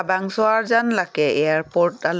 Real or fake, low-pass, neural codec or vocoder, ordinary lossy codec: real; none; none; none